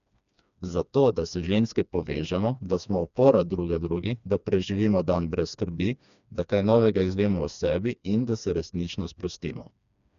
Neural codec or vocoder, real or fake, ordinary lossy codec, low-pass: codec, 16 kHz, 2 kbps, FreqCodec, smaller model; fake; none; 7.2 kHz